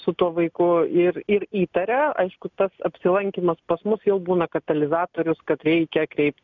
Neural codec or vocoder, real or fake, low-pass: none; real; 7.2 kHz